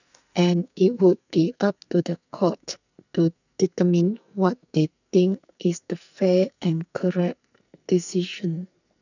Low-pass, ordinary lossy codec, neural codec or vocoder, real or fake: 7.2 kHz; none; codec, 44.1 kHz, 2.6 kbps, SNAC; fake